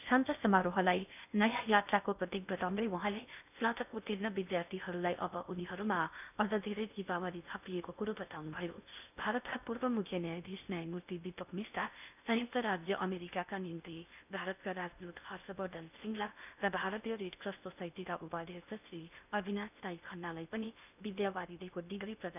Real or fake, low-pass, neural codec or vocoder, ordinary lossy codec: fake; 3.6 kHz; codec, 16 kHz in and 24 kHz out, 0.6 kbps, FocalCodec, streaming, 2048 codes; none